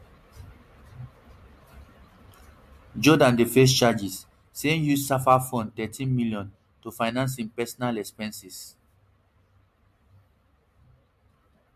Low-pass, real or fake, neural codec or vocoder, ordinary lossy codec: 14.4 kHz; real; none; MP3, 64 kbps